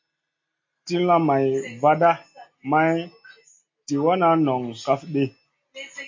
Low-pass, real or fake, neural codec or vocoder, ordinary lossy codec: 7.2 kHz; real; none; MP3, 32 kbps